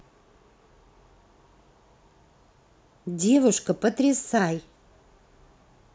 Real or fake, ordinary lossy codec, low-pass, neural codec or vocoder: real; none; none; none